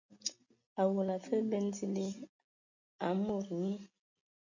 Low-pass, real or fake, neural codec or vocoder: 7.2 kHz; real; none